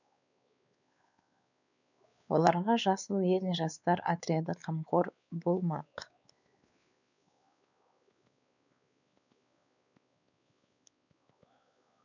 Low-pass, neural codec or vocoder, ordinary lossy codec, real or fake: 7.2 kHz; codec, 16 kHz, 4 kbps, X-Codec, WavLM features, trained on Multilingual LibriSpeech; none; fake